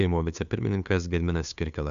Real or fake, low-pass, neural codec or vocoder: fake; 7.2 kHz; codec, 16 kHz, 2 kbps, FunCodec, trained on Chinese and English, 25 frames a second